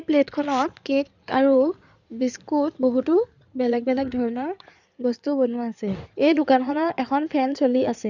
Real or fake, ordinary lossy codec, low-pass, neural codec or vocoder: fake; none; 7.2 kHz; codec, 16 kHz in and 24 kHz out, 2.2 kbps, FireRedTTS-2 codec